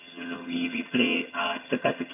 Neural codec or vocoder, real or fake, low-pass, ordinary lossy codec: vocoder, 22.05 kHz, 80 mel bands, HiFi-GAN; fake; 3.6 kHz; none